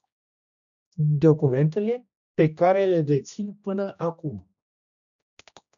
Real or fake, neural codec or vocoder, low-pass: fake; codec, 16 kHz, 1 kbps, X-Codec, HuBERT features, trained on general audio; 7.2 kHz